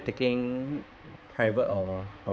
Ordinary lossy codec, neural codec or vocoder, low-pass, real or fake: none; codec, 16 kHz, 4 kbps, X-Codec, HuBERT features, trained on balanced general audio; none; fake